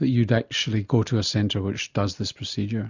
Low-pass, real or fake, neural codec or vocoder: 7.2 kHz; real; none